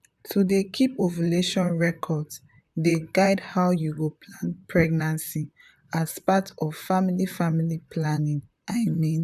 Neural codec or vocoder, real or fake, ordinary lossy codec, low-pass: vocoder, 44.1 kHz, 128 mel bands, Pupu-Vocoder; fake; none; 14.4 kHz